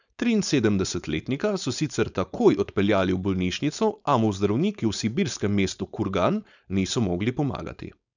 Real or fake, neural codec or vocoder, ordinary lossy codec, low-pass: fake; codec, 16 kHz, 4.8 kbps, FACodec; none; 7.2 kHz